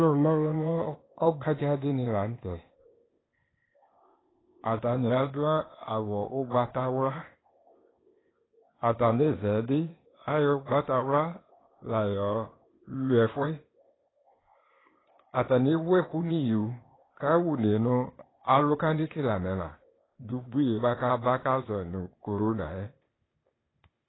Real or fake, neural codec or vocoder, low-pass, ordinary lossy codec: fake; codec, 16 kHz, 0.8 kbps, ZipCodec; 7.2 kHz; AAC, 16 kbps